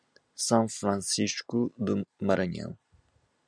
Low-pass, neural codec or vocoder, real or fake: 9.9 kHz; none; real